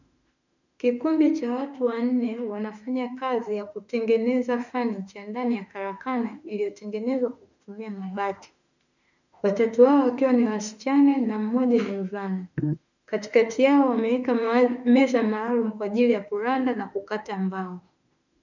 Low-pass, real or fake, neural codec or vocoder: 7.2 kHz; fake; autoencoder, 48 kHz, 32 numbers a frame, DAC-VAE, trained on Japanese speech